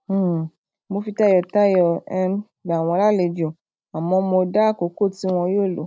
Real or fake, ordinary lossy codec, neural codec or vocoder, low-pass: real; none; none; none